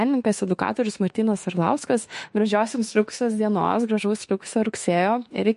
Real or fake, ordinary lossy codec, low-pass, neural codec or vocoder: fake; MP3, 48 kbps; 14.4 kHz; autoencoder, 48 kHz, 32 numbers a frame, DAC-VAE, trained on Japanese speech